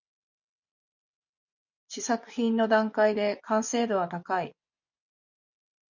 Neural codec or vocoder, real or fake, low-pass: vocoder, 24 kHz, 100 mel bands, Vocos; fake; 7.2 kHz